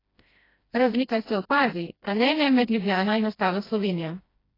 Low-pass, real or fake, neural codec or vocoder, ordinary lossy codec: 5.4 kHz; fake; codec, 16 kHz, 1 kbps, FreqCodec, smaller model; AAC, 24 kbps